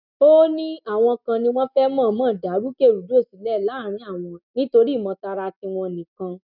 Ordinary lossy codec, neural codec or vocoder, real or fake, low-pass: none; none; real; 5.4 kHz